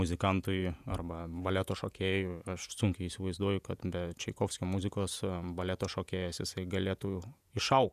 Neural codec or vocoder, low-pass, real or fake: vocoder, 48 kHz, 128 mel bands, Vocos; 14.4 kHz; fake